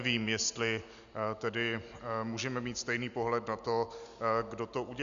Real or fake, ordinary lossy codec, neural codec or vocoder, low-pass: real; AAC, 96 kbps; none; 7.2 kHz